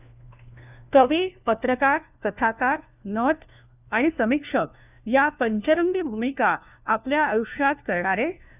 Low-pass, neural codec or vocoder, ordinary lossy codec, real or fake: 3.6 kHz; codec, 16 kHz, 1 kbps, FunCodec, trained on LibriTTS, 50 frames a second; none; fake